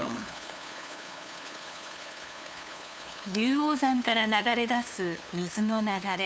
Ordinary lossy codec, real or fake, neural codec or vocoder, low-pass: none; fake; codec, 16 kHz, 2 kbps, FunCodec, trained on LibriTTS, 25 frames a second; none